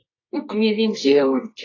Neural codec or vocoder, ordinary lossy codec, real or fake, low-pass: codec, 24 kHz, 0.9 kbps, WavTokenizer, medium music audio release; AAC, 32 kbps; fake; 7.2 kHz